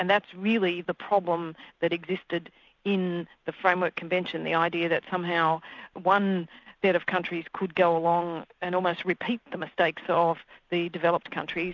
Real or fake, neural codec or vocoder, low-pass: real; none; 7.2 kHz